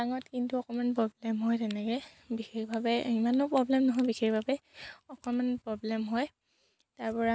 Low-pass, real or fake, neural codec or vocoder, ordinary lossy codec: none; real; none; none